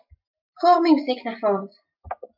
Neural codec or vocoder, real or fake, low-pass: none; real; 5.4 kHz